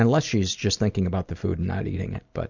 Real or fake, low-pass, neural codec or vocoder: real; 7.2 kHz; none